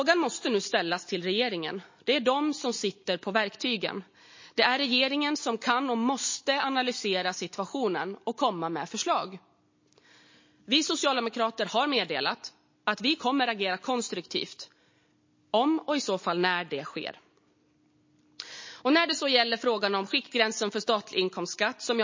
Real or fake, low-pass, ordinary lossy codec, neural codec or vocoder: real; 7.2 kHz; MP3, 32 kbps; none